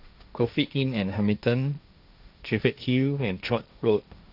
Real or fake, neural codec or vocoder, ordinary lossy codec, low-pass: fake; codec, 16 kHz, 1.1 kbps, Voila-Tokenizer; none; 5.4 kHz